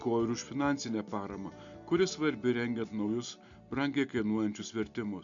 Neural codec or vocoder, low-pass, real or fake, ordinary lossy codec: none; 7.2 kHz; real; MP3, 96 kbps